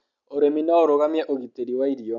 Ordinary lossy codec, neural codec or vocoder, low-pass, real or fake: MP3, 64 kbps; none; 7.2 kHz; real